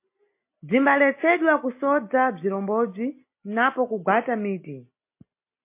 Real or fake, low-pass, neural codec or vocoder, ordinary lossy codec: real; 3.6 kHz; none; MP3, 24 kbps